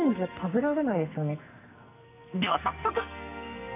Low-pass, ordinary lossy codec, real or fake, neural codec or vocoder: 3.6 kHz; none; fake; codec, 44.1 kHz, 2.6 kbps, SNAC